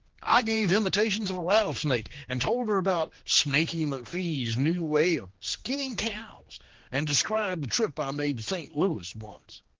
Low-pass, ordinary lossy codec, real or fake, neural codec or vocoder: 7.2 kHz; Opus, 16 kbps; fake; codec, 16 kHz, 2 kbps, X-Codec, HuBERT features, trained on general audio